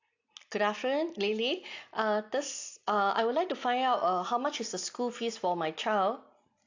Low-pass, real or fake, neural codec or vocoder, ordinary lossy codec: 7.2 kHz; real; none; AAC, 48 kbps